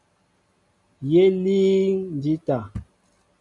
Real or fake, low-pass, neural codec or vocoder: real; 10.8 kHz; none